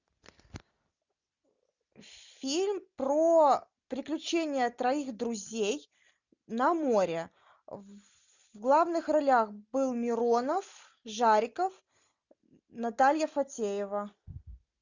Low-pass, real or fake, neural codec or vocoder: 7.2 kHz; real; none